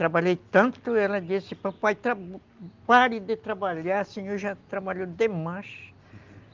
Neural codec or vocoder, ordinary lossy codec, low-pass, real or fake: none; Opus, 24 kbps; 7.2 kHz; real